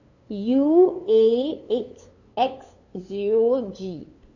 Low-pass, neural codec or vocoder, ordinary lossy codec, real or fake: 7.2 kHz; codec, 16 kHz, 2 kbps, FunCodec, trained on LibriTTS, 25 frames a second; none; fake